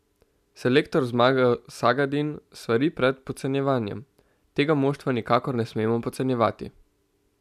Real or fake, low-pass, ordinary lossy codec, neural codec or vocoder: real; 14.4 kHz; none; none